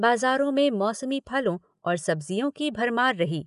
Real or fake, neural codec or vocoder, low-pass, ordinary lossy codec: real; none; 10.8 kHz; none